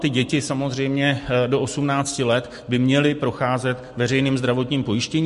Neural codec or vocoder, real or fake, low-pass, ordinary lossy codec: none; real; 14.4 kHz; MP3, 48 kbps